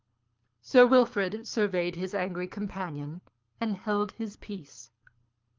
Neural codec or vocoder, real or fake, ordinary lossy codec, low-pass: codec, 24 kHz, 6 kbps, HILCodec; fake; Opus, 24 kbps; 7.2 kHz